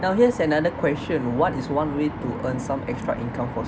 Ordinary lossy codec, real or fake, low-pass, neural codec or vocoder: none; real; none; none